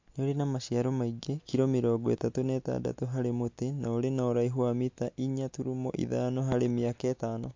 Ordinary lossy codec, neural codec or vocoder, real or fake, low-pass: MP3, 48 kbps; none; real; 7.2 kHz